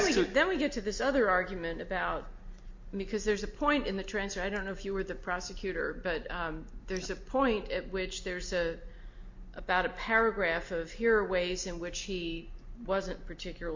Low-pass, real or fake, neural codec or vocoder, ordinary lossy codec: 7.2 kHz; real; none; MP3, 48 kbps